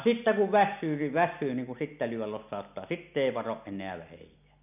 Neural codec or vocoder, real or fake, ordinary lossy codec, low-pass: none; real; none; 3.6 kHz